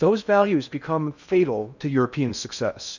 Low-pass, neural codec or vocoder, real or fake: 7.2 kHz; codec, 16 kHz in and 24 kHz out, 0.8 kbps, FocalCodec, streaming, 65536 codes; fake